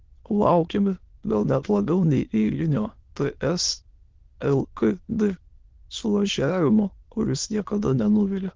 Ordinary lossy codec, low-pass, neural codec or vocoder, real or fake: Opus, 16 kbps; 7.2 kHz; autoencoder, 22.05 kHz, a latent of 192 numbers a frame, VITS, trained on many speakers; fake